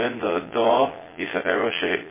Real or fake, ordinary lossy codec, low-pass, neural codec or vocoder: fake; MP3, 16 kbps; 3.6 kHz; vocoder, 22.05 kHz, 80 mel bands, Vocos